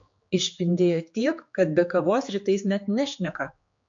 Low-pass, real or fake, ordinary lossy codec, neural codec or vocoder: 7.2 kHz; fake; MP3, 48 kbps; codec, 16 kHz, 4 kbps, X-Codec, HuBERT features, trained on general audio